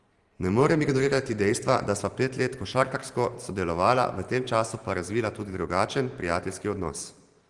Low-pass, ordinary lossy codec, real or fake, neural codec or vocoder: 10.8 kHz; Opus, 24 kbps; fake; vocoder, 44.1 kHz, 128 mel bands every 512 samples, BigVGAN v2